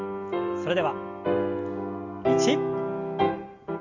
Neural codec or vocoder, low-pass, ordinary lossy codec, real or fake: none; 7.2 kHz; Opus, 32 kbps; real